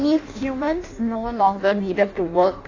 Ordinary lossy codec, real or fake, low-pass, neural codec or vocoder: AAC, 32 kbps; fake; 7.2 kHz; codec, 16 kHz in and 24 kHz out, 0.6 kbps, FireRedTTS-2 codec